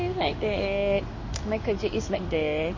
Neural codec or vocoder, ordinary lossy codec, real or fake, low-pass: codec, 16 kHz in and 24 kHz out, 1 kbps, XY-Tokenizer; MP3, 32 kbps; fake; 7.2 kHz